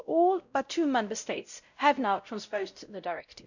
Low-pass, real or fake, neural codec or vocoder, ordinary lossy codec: 7.2 kHz; fake; codec, 16 kHz, 0.5 kbps, X-Codec, WavLM features, trained on Multilingual LibriSpeech; none